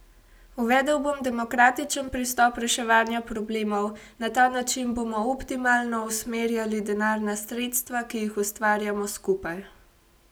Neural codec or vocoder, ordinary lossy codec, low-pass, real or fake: none; none; none; real